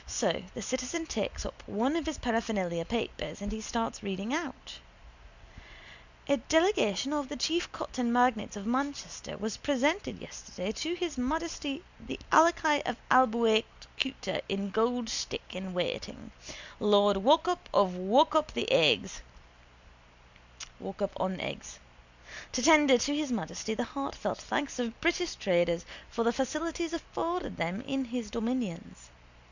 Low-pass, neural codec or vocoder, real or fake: 7.2 kHz; none; real